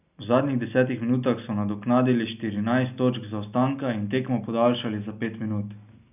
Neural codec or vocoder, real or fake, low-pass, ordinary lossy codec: none; real; 3.6 kHz; none